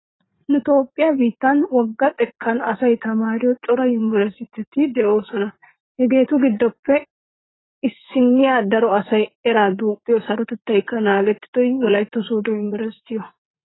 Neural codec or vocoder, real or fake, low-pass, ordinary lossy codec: vocoder, 44.1 kHz, 80 mel bands, Vocos; fake; 7.2 kHz; AAC, 16 kbps